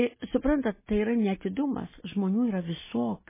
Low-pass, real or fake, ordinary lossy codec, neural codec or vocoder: 3.6 kHz; real; MP3, 16 kbps; none